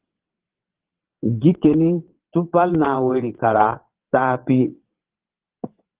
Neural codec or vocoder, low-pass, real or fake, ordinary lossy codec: vocoder, 22.05 kHz, 80 mel bands, WaveNeXt; 3.6 kHz; fake; Opus, 16 kbps